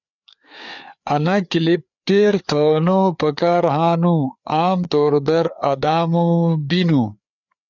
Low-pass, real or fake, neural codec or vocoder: 7.2 kHz; fake; codec, 16 kHz, 4 kbps, FreqCodec, larger model